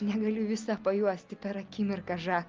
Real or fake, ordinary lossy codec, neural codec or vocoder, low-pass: real; Opus, 24 kbps; none; 7.2 kHz